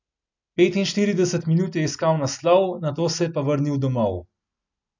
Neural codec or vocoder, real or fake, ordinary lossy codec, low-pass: none; real; none; 7.2 kHz